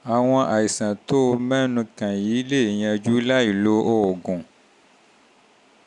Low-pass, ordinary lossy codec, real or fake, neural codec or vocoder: 10.8 kHz; none; real; none